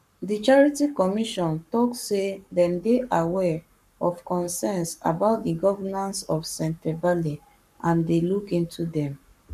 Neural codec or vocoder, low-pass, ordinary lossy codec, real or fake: codec, 44.1 kHz, 7.8 kbps, Pupu-Codec; 14.4 kHz; none; fake